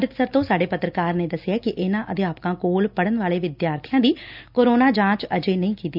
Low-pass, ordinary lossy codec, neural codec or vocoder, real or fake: 5.4 kHz; none; none; real